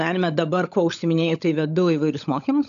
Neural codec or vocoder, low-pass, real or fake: codec, 16 kHz, 16 kbps, FunCodec, trained on LibriTTS, 50 frames a second; 7.2 kHz; fake